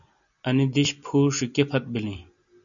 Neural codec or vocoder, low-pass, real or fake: none; 7.2 kHz; real